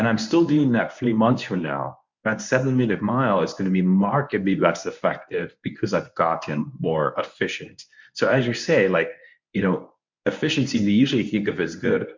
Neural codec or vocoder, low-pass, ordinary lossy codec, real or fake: codec, 24 kHz, 0.9 kbps, WavTokenizer, medium speech release version 1; 7.2 kHz; MP3, 64 kbps; fake